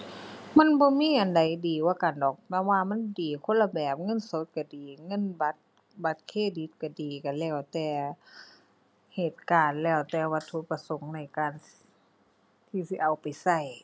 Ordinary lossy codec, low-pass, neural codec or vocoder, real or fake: none; none; none; real